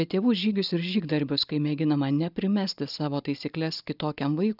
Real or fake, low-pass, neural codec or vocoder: real; 5.4 kHz; none